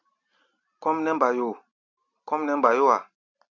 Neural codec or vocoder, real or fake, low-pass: none; real; 7.2 kHz